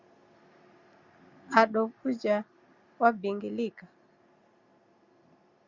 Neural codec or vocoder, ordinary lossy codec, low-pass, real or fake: none; Opus, 64 kbps; 7.2 kHz; real